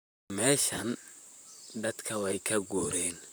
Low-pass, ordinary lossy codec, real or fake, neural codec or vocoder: none; none; fake; vocoder, 44.1 kHz, 128 mel bands, Pupu-Vocoder